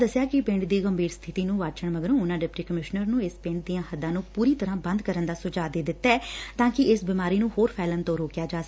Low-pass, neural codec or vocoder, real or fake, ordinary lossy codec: none; none; real; none